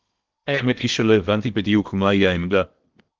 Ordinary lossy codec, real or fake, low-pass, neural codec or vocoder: Opus, 24 kbps; fake; 7.2 kHz; codec, 16 kHz in and 24 kHz out, 0.8 kbps, FocalCodec, streaming, 65536 codes